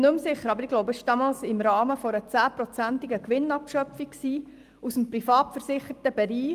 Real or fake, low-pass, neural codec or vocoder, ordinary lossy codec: real; 14.4 kHz; none; Opus, 32 kbps